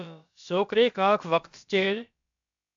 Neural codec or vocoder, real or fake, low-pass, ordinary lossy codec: codec, 16 kHz, about 1 kbps, DyCAST, with the encoder's durations; fake; 7.2 kHz; AAC, 64 kbps